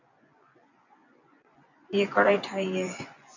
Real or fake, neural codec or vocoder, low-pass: fake; vocoder, 24 kHz, 100 mel bands, Vocos; 7.2 kHz